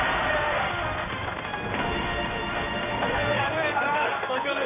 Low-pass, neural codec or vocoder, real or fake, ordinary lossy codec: 3.6 kHz; codec, 16 kHz in and 24 kHz out, 1 kbps, XY-Tokenizer; fake; MP3, 24 kbps